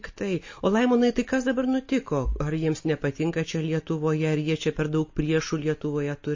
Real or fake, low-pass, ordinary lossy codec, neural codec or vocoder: real; 7.2 kHz; MP3, 32 kbps; none